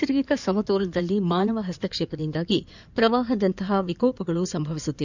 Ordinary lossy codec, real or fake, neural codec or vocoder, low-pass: none; fake; codec, 16 kHz in and 24 kHz out, 2.2 kbps, FireRedTTS-2 codec; 7.2 kHz